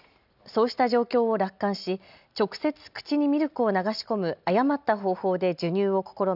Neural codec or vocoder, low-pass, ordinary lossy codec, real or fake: none; 5.4 kHz; none; real